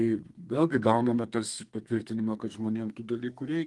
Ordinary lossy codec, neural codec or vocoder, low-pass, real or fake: Opus, 24 kbps; codec, 32 kHz, 1.9 kbps, SNAC; 10.8 kHz; fake